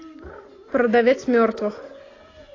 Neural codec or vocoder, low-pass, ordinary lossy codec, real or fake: none; 7.2 kHz; AAC, 32 kbps; real